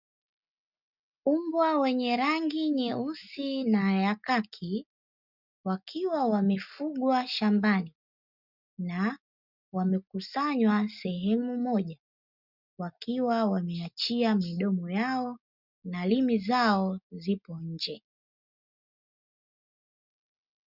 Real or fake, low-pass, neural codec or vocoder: real; 5.4 kHz; none